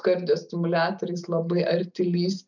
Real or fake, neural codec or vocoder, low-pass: real; none; 7.2 kHz